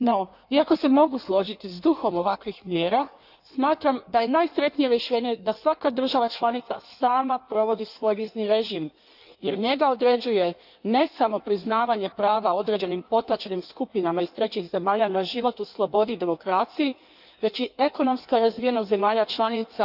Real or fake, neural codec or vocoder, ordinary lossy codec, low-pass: fake; codec, 16 kHz in and 24 kHz out, 1.1 kbps, FireRedTTS-2 codec; none; 5.4 kHz